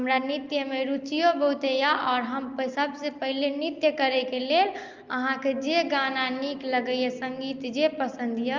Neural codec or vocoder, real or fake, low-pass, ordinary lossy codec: none; real; 7.2 kHz; Opus, 24 kbps